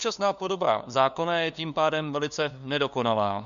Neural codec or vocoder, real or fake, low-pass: codec, 16 kHz, 2 kbps, FunCodec, trained on LibriTTS, 25 frames a second; fake; 7.2 kHz